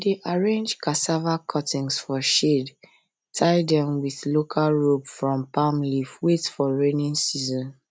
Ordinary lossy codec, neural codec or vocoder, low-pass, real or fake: none; none; none; real